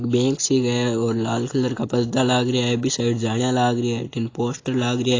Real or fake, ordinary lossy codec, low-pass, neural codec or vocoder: real; AAC, 32 kbps; 7.2 kHz; none